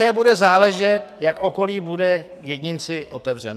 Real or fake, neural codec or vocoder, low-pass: fake; codec, 44.1 kHz, 2.6 kbps, SNAC; 14.4 kHz